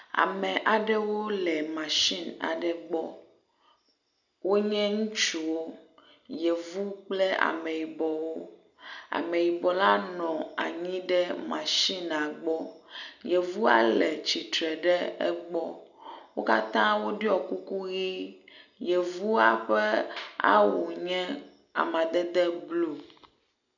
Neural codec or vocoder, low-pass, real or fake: none; 7.2 kHz; real